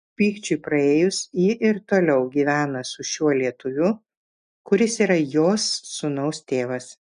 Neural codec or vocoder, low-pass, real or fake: none; 9.9 kHz; real